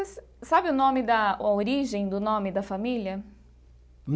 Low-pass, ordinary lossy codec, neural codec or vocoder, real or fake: none; none; none; real